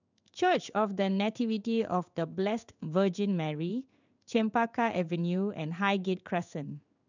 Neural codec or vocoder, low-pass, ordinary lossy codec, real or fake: codec, 16 kHz in and 24 kHz out, 1 kbps, XY-Tokenizer; 7.2 kHz; none; fake